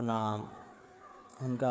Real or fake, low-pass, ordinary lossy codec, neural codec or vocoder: fake; none; none; codec, 16 kHz, 4 kbps, FreqCodec, larger model